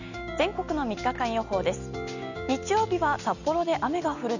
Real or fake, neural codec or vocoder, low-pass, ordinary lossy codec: real; none; 7.2 kHz; none